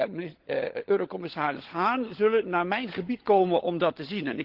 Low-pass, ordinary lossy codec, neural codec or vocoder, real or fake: 5.4 kHz; Opus, 16 kbps; codec, 16 kHz, 16 kbps, FunCodec, trained on LibriTTS, 50 frames a second; fake